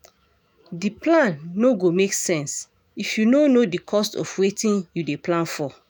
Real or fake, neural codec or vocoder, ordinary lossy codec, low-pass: fake; autoencoder, 48 kHz, 128 numbers a frame, DAC-VAE, trained on Japanese speech; none; none